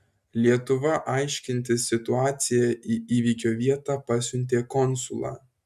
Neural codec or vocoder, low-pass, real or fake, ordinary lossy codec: none; 14.4 kHz; real; MP3, 96 kbps